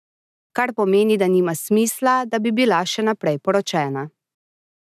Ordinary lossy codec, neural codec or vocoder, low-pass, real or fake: none; none; 14.4 kHz; real